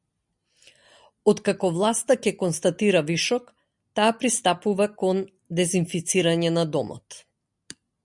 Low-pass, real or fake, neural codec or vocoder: 10.8 kHz; real; none